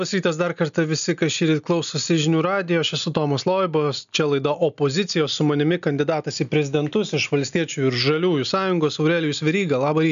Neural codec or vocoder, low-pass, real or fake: none; 7.2 kHz; real